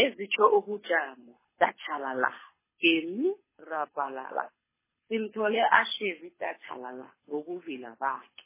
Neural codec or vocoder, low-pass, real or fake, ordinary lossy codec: codec, 24 kHz, 6 kbps, HILCodec; 3.6 kHz; fake; MP3, 16 kbps